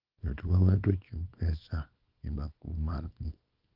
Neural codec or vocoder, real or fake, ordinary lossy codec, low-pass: codec, 24 kHz, 0.9 kbps, WavTokenizer, small release; fake; Opus, 32 kbps; 5.4 kHz